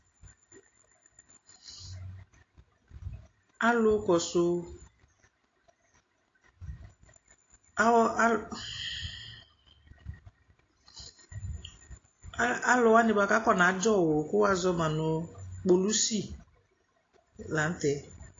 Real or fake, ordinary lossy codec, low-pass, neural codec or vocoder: real; AAC, 32 kbps; 7.2 kHz; none